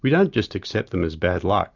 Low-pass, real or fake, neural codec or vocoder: 7.2 kHz; real; none